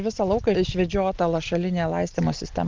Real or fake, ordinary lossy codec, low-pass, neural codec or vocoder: real; Opus, 32 kbps; 7.2 kHz; none